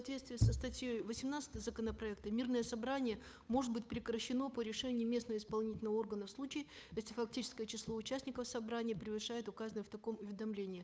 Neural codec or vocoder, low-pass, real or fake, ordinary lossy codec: codec, 16 kHz, 8 kbps, FunCodec, trained on Chinese and English, 25 frames a second; none; fake; none